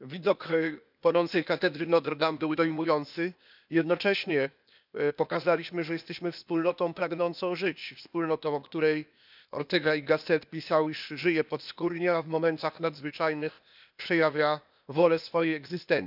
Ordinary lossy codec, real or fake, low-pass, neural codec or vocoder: none; fake; 5.4 kHz; codec, 16 kHz, 0.8 kbps, ZipCodec